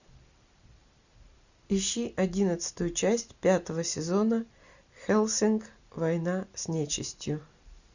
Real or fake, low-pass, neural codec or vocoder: real; 7.2 kHz; none